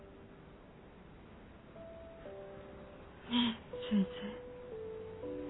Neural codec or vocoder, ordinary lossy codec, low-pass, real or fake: none; AAC, 16 kbps; 7.2 kHz; real